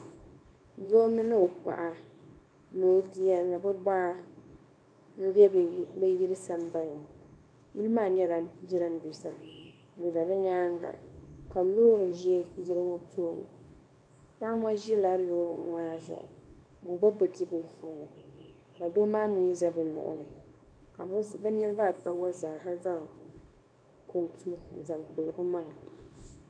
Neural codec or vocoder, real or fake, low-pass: codec, 24 kHz, 0.9 kbps, WavTokenizer, small release; fake; 9.9 kHz